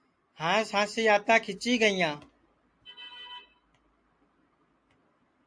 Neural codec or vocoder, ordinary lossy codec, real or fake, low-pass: none; AAC, 64 kbps; real; 9.9 kHz